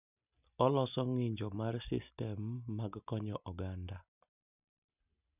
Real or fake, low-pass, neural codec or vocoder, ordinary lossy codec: real; 3.6 kHz; none; none